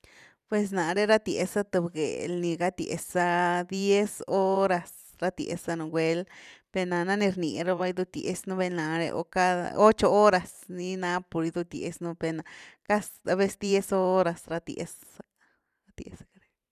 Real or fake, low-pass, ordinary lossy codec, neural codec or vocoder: fake; 14.4 kHz; none; vocoder, 44.1 kHz, 128 mel bands every 512 samples, BigVGAN v2